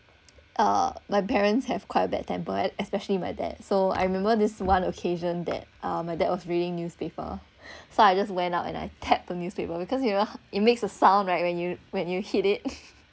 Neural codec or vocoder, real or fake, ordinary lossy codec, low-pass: none; real; none; none